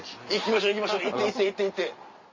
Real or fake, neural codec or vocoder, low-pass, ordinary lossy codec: real; none; 7.2 kHz; MP3, 32 kbps